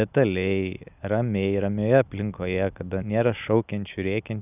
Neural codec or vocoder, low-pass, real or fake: none; 3.6 kHz; real